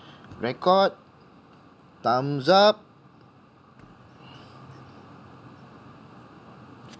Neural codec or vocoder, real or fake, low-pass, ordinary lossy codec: none; real; none; none